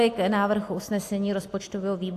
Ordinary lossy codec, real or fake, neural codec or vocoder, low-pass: AAC, 64 kbps; real; none; 14.4 kHz